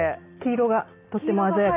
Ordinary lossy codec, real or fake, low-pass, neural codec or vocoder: AAC, 24 kbps; real; 3.6 kHz; none